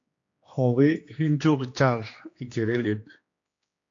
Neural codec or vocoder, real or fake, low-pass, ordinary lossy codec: codec, 16 kHz, 2 kbps, X-Codec, HuBERT features, trained on general audio; fake; 7.2 kHz; AAC, 48 kbps